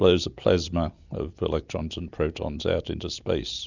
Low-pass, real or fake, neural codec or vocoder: 7.2 kHz; real; none